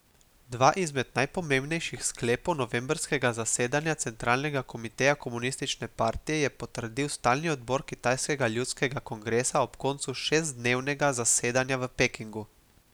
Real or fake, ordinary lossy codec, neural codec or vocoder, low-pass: real; none; none; none